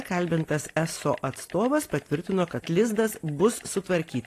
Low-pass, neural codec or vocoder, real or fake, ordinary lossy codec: 14.4 kHz; none; real; AAC, 48 kbps